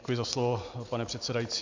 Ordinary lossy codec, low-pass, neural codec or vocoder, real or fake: MP3, 64 kbps; 7.2 kHz; none; real